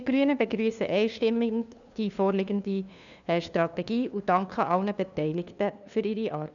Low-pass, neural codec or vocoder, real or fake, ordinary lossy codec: 7.2 kHz; codec, 16 kHz, 2 kbps, FunCodec, trained on LibriTTS, 25 frames a second; fake; none